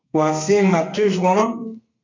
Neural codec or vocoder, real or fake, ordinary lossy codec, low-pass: codec, 32 kHz, 1.9 kbps, SNAC; fake; MP3, 64 kbps; 7.2 kHz